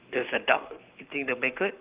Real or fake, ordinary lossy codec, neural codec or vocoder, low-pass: fake; Opus, 24 kbps; codec, 44.1 kHz, 7.8 kbps, Pupu-Codec; 3.6 kHz